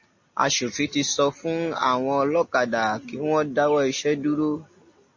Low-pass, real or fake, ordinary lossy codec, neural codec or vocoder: 7.2 kHz; real; MP3, 32 kbps; none